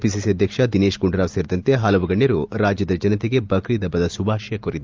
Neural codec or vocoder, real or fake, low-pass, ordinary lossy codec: none; real; 7.2 kHz; Opus, 24 kbps